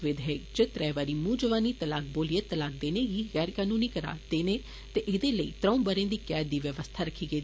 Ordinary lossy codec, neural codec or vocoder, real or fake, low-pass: none; none; real; none